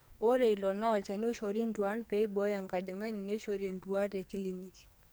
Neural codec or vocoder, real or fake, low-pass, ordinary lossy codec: codec, 44.1 kHz, 2.6 kbps, SNAC; fake; none; none